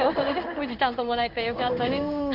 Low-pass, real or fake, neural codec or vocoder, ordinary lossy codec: 5.4 kHz; fake; codec, 16 kHz in and 24 kHz out, 1 kbps, XY-Tokenizer; none